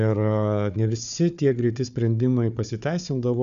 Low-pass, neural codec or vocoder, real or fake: 7.2 kHz; codec, 16 kHz, 4 kbps, FunCodec, trained on Chinese and English, 50 frames a second; fake